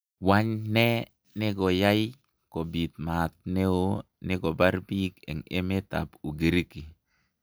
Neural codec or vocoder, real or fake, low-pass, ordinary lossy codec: none; real; none; none